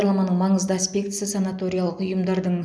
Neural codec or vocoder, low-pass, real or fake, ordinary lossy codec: none; none; real; none